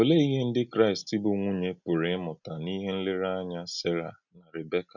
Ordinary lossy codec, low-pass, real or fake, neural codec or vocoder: none; 7.2 kHz; real; none